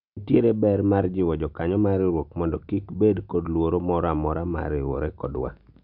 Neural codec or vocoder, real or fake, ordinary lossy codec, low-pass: none; real; none; 5.4 kHz